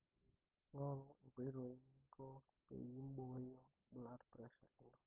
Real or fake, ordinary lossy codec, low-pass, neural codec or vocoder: real; Opus, 32 kbps; 3.6 kHz; none